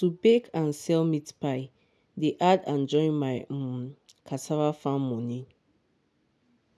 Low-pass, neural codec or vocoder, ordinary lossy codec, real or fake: none; none; none; real